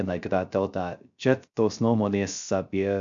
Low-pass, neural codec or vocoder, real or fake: 7.2 kHz; codec, 16 kHz, 0.3 kbps, FocalCodec; fake